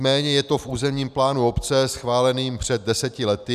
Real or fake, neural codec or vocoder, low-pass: real; none; 14.4 kHz